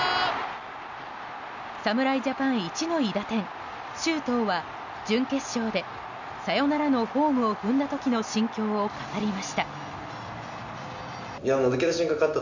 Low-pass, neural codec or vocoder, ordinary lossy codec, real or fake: 7.2 kHz; none; none; real